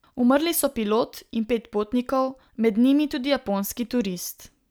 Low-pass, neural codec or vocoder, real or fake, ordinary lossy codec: none; none; real; none